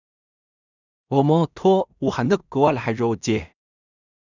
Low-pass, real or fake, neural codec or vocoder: 7.2 kHz; fake; codec, 16 kHz in and 24 kHz out, 0.4 kbps, LongCat-Audio-Codec, two codebook decoder